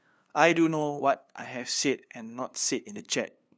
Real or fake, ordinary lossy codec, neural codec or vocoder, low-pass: fake; none; codec, 16 kHz, 2 kbps, FunCodec, trained on LibriTTS, 25 frames a second; none